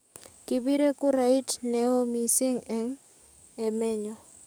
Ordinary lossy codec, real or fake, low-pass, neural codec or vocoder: none; fake; none; codec, 44.1 kHz, 7.8 kbps, DAC